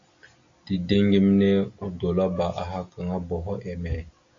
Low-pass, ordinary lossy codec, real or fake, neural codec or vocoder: 7.2 kHz; AAC, 48 kbps; real; none